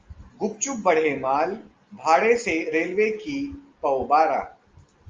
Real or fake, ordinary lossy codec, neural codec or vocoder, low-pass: real; Opus, 32 kbps; none; 7.2 kHz